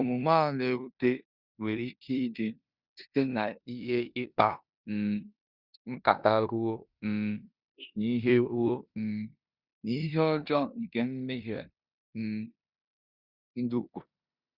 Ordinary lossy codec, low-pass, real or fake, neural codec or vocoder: Opus, 64 kbps; 5.4 kHz; fake; codec, 16 kHz in and 24 kHz out, 0.9 kbps, LongCat-Audio-Codec, four codebook decoder